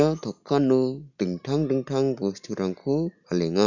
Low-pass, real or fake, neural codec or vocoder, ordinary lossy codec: 7.2 kHz; real; none; none